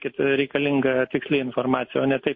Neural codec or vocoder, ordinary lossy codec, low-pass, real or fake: none; MP3, 32 kbps; 7.2 kHz; real